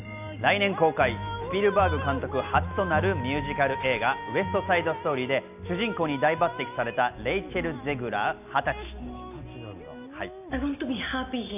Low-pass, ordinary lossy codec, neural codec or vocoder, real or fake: 3.6 kHz; none; none; real